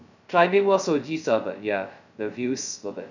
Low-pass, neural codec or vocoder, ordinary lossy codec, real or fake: 7.2 kHz; codec, 16 kHz, 0.2 kbps, FocalCodec; none; fake